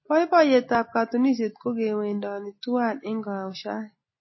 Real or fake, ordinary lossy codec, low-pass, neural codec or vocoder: real; MP3, 24 kbps; 7.2 kHz; none